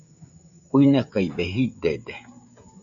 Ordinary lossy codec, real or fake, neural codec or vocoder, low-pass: MP3, 48 kbps; fake; codec, 16 kHz, 16 kbps, FreqCodec, smaller model; 7.2 kHz